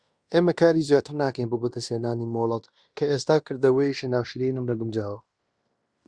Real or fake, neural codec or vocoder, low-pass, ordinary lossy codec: fake; codec, 16 kHz in and 24 kHz out, 0.9 kbps, LongCat-Audio-Codec, fine tuned four codebook decoder; 9.9 kHz; Opus, 64 kbps